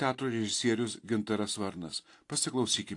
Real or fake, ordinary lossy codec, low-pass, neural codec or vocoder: real; AAC, 48 kbps; 10.8 kHz; none